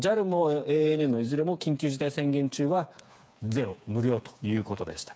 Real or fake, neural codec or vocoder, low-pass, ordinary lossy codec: fake; codec, 16 kHz, 4 kbps, FreqCodec, smaller model; none; none